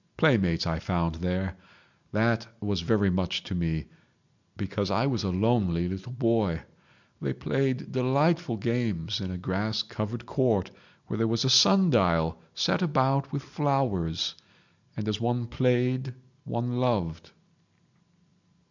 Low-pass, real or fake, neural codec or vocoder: 7.2 kHz; real; none